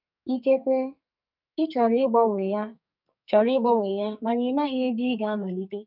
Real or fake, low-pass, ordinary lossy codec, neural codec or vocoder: fake; 5.4 kHz; none; codec, 44.1 kHz, 2.6 kbps, SNAC